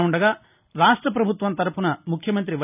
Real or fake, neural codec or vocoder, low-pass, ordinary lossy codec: real; none; 3.6 kHz; none